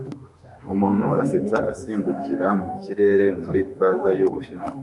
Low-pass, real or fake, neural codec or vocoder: 10.8 kHz; fake; autoencoder, 48 kHz, 32 numbers a frame, DAC-VAE, trained on Japanese speech